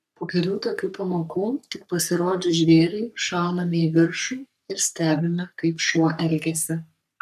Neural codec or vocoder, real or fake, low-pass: codec, 44.1 kHz, 3.4 kbps, Pupu-Codec; fake; 14.4 kHz